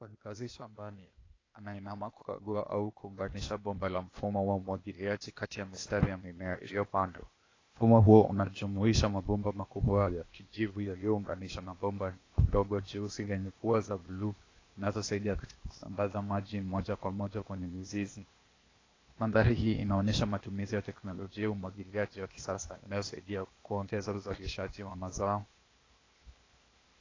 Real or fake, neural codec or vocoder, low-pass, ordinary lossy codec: fake; codec, 16 kHz, 0.8 kbps, ZipCodec; 7.2 kHz; AAC, 32 kbps